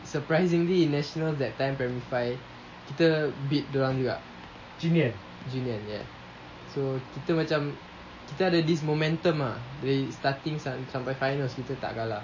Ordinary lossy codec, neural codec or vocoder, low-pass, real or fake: MP3, 32 kbps; none; 7.2 kHz; real